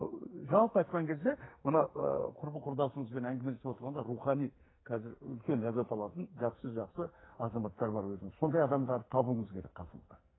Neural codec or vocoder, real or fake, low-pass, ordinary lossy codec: codec, 44.1 kHz, 2.6 kbps, SNAC; fake; 7.2 kHz; AAC, 16 kbps